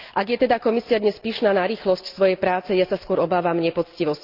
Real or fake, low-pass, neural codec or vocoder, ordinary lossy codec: real; 5.4 kHz; none; Opus, 24 kbps